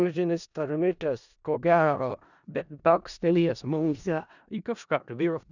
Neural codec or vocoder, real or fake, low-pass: codec, 16 kHz in and 24 kHz out, 0.4 kbps, LongCat-Audio-Codec, four codebook decoder; fake; 7.2 kHz